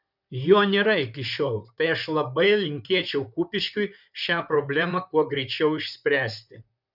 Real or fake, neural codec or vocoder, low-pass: fake; vocoder, 44.1 kHz, 128 mel bands, Pupu-Vocoder; 5.4 kHz